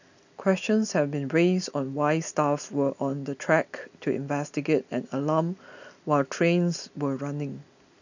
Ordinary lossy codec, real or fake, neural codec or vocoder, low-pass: none; real; none; 7.2 kHz